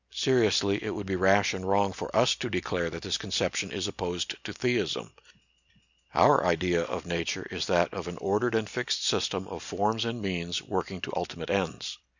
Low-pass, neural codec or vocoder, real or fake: 7.2 kHz; none; real